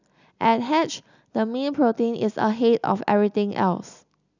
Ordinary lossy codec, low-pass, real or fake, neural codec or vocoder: none; 7.2 kHz; real; none